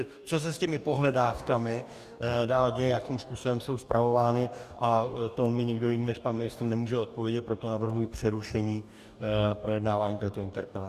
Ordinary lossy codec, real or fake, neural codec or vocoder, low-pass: Opus, 64 kbps; fake; codec, 44.1 kHz, 2.6 kbps, DAC; 14.4 kHz